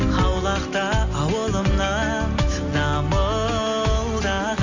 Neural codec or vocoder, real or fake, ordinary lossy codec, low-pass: none; real; AAC, 48 kbps; 7.2 kHz